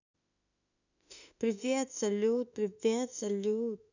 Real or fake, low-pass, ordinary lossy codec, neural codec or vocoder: fake; 7.2 kHz; none; autoencoder, 48 kHz, 32 numbers a frame, DAC-VAE, trained on Japanese speech